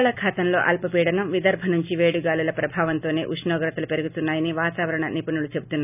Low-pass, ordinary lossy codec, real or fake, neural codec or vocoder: 3.6 kHz; none; real; none